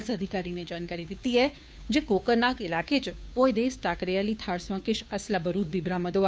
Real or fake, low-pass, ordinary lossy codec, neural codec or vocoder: fake; none; none; codec, 16 kHz, 2 kbps, FunCodec, trained on Chinese and English, 25 frames a second